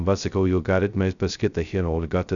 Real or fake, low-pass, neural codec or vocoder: fake; 7.2 kHz; codec, 16 kHz, 0.2 kbps, FocalCodec